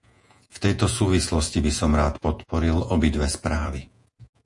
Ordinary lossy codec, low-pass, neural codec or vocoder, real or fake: AAC, 64 kbps; 10.8 kHz; vocoder, 48 kHz, 128 mel bands, Vocos; fake